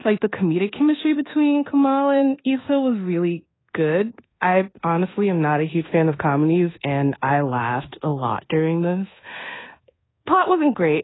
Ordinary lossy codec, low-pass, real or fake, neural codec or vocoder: AAC, 16 kbps; 7.2 kHz; fake; codec, 24 kHz, 1.2 kbps, DualCodec